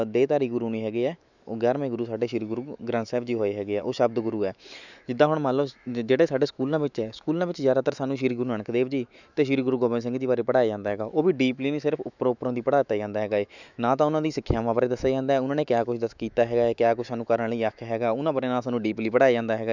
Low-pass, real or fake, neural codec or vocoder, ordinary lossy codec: 7.2 kHz; fake; autoencoder, 48 kHz, 128 numbers a frame, DAC-VAE, trained on Japanese speech; none